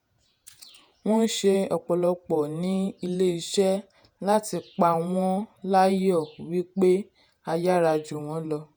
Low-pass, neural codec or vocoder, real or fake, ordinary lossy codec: none; vocoder, 48 kHz, 128 mel bands, Vocos; fake; none